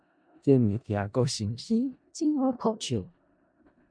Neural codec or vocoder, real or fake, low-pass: codec, 16 kHz in and 24 kHz out, 0.4 kbps, LongCat-Audio-Codec, four codebook decoder; fake; 9.9 kHz